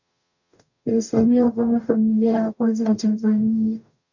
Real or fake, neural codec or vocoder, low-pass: fake; codec, 44.1 kHz, 0.9 kbps, DAC; 7.2 kHz